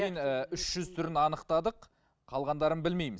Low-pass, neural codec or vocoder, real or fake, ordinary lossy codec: none; none; real; none